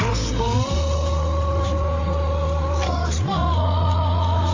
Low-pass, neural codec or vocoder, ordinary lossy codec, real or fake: 7.2 kHz; codec, 16 kHz, 8 kbps, FreqCodec, larger model; AAC, 32 kbps; fake